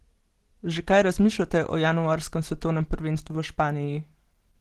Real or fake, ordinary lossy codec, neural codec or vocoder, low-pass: real; Opus, 16 kbps; none; 14.4 kHz